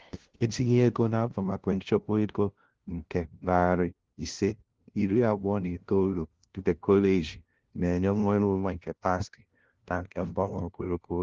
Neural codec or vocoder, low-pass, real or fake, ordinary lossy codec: codec, 16 kHz, 0.5 kbps, FunCodec, trained on LibriTTS, 25 frames a second; 7.2 kHz; fake; Opus, 16 kbps